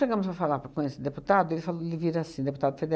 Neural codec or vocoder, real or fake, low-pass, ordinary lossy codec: none; real; none; none